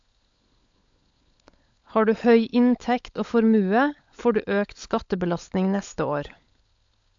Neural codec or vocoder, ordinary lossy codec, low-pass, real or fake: codec, 16 kHz, 16 kbps, FunCodec, trained on LibriTTS, 50 frames a second; AAC, 64 kbps; 7.2 kHz; fake